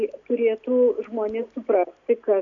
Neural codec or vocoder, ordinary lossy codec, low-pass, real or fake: none; MP3, 96 kbps; 7.2 kHz; real